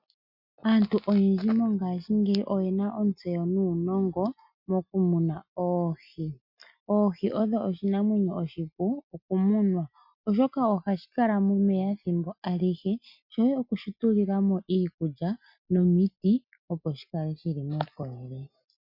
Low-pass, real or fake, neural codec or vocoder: 5.4 kHz; real; none